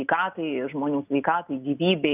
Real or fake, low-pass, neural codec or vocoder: real; 3.6 kHz; none